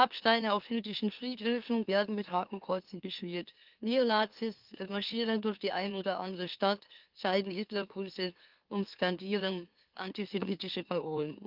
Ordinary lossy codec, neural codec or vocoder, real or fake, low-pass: Opus, 32 kbps; autoencoder, 44.1 kHz, a latent of 192 numbers a frame, MeloTTS; fake; 5.4 kHz